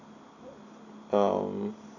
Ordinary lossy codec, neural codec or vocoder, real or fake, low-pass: none; none; real; 7.2 kHz